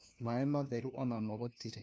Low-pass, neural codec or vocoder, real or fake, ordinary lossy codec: none; codec, 16 kHz, 1 kbps, FunCodec, trained on LibriTTS, 50 frames a second; fake; none